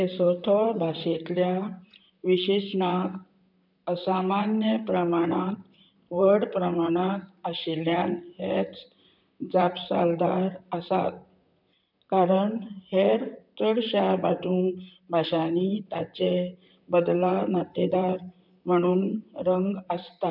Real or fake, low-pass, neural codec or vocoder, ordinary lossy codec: fake; 5.4 kHz; vocoder, 44.1 kHz, 128 mel bands, Pupu-Vocoder; none